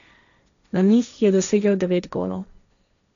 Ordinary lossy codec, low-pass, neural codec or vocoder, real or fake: none; 7.2 kHz; codec, 16 kHz, 1.1 kbps, Voila-Tokenizer; fake